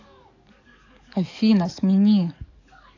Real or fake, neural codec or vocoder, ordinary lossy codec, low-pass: fake; codec, 44.1 kHz, 7.8 kbps, DAC; AAC, 48 kbps; 7.2 kHz